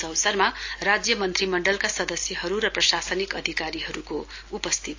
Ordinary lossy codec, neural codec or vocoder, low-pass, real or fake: AAC, 48 kbps; none; 7.2 kHz; real